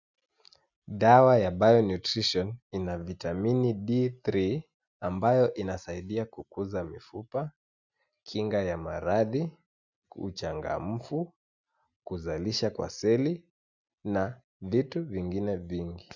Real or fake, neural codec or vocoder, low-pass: real; none; 7.2 kHz